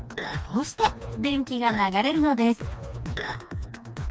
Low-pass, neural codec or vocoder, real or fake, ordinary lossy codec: none; codec, 16 kHz, 2 kbps, FreqCodec, smaller model; fake; none